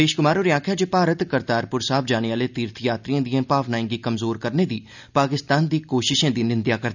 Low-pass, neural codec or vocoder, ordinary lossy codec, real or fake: 7.2 kHz; none; none; real